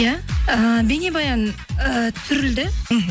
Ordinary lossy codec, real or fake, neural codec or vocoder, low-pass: none; real; none; none